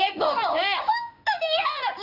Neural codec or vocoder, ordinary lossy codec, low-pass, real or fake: codec, 16 kHz in and 24 kHz out, 1 kbps, XY-Tokenizer; none; 5.4 kHz; fake